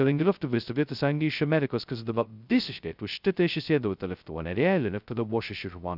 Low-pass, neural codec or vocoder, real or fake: 5.4 kHz; codec, 16 kHz, 0.2 kbps, FocalCodec; fake